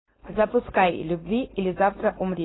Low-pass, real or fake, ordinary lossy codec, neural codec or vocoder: 7.2 kHz; fake; AAC, 16 kbps; codec, 16 kHz, 4.8 kbps, FACodec